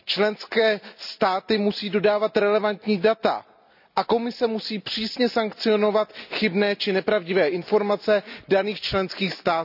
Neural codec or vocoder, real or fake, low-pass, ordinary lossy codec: none; real; 5.4 kHz; none